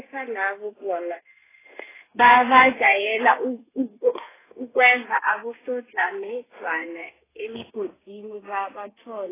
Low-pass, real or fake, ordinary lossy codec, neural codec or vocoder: 3.6 kHz; fake; AAC, 16 kbps; codec, 32 kHz, 1.9 kbps, SNAC